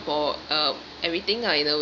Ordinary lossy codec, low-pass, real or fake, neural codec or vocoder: none; 7.2 kHz; real; none